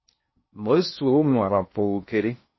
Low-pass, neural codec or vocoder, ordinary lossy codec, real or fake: 7.2 kHz; codec, 16 kHz in and 24 kHz out, 0.6 kbps, FocalCodec, streaming, 4096 codes; MP3, 24 kbps; fake